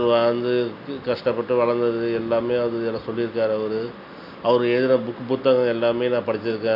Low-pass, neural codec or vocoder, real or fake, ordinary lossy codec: 5.4 kHz; none; real; none